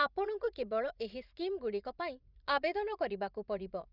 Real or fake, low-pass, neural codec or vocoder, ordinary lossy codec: fake; 5.4 kHz; vocoder, 44.1 kHz, 80 mel bands, Vocos; none